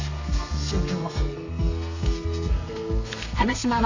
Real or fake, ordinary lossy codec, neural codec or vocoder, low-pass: fake; AAC, 48 kbps; codec, 32 kHz, 1.9 kbps, SNAC; 7.2 kHz